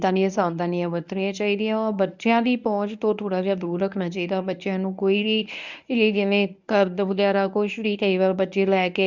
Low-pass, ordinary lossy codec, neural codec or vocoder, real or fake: 7.2 kHz; none; codec, 24 kHz, 0.9 kbps, WavTokenizer, medium speech release version 1; fake